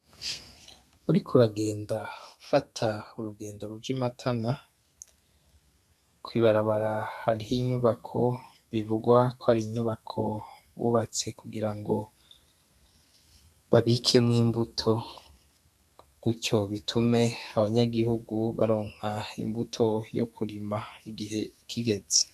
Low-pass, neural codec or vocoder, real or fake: 14.4 kHz; codec, 32 kHz, 1.9 kbps, SNAC; fake